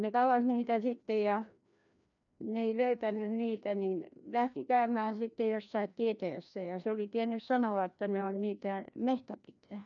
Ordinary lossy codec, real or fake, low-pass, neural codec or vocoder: none; fake; 7.2 kHz; codec, 16 kHz, 1 kbps, FreqCodec, larger model